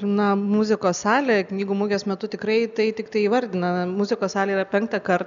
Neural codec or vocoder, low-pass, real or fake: none; 7.2 kHz; real